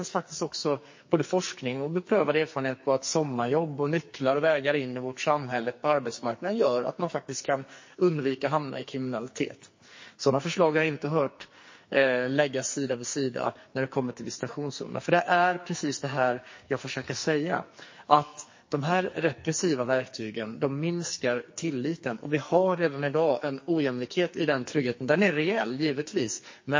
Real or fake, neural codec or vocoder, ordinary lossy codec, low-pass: fake; codec, 44.1 kHz, 2.6 kbps, SNAC; MP3, 32 kbps; 7.2 kHz